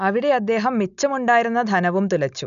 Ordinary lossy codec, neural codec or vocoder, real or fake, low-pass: none; none; real; 7.2 kHz